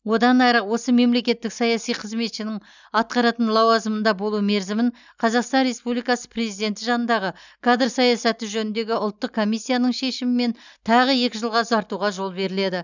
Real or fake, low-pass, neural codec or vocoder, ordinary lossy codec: real; 7.2 kHz; none; none